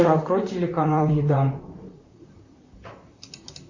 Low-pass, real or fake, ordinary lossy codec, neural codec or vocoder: 7.2 kHz; fake; Opus, 64 kbps; vocoder, 44.1 kHz, 128 mel bands, Pupu-Vocoder